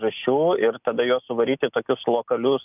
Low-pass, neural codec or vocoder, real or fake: 3.6 kHz; none; real